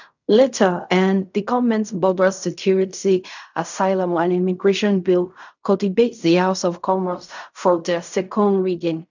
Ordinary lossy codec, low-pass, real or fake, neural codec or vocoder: none; 7.2 kHz; fake; codec, 16 kHz in and 24 kHz out, 0.4 kbps, LongCat-Audio-Codec, fine tuned four codebook decoder